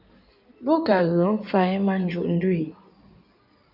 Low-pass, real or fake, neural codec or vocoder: 5.4 kHz; fake; codec, 16 kHz in and 24 kHz out, 2.2 kbps, FireRedTTS-2 codec